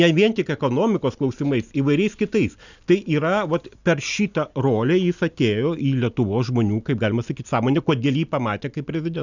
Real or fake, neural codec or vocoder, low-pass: real; none; 7.2 kHz